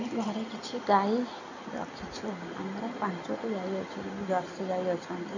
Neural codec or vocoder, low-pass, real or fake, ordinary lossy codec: none; 7.2 kHz; real; none